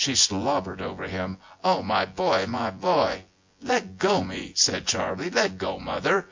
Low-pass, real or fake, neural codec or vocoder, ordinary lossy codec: 7.2 kHz; fake; vocoder, 24 kHz, 100 mel bands, Vocos; MP3, 48 kbps